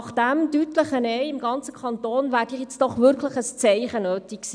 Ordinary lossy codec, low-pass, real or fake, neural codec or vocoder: none; 9.9 kHz; real; none